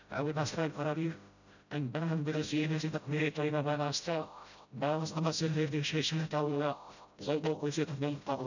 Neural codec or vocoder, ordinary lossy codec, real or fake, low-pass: codec, 16 kHz, 0.5 kbps, FreqCodec, smaller model; none; fake; 7.2 kHz